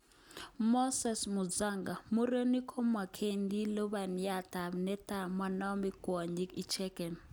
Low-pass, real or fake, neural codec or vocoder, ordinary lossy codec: none; real; none; none